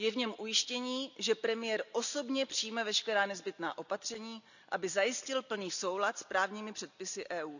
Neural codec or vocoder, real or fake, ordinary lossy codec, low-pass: none; real; none; 7.2 kHz